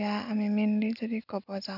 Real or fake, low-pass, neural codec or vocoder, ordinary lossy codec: real; 5.4 kHz; none; none